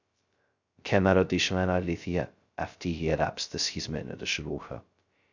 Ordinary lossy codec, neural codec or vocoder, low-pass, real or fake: Opus, 64 kbps; codec, 16 kHz, 0.2 kbps, FocalCodec; 7.2 kHz; fake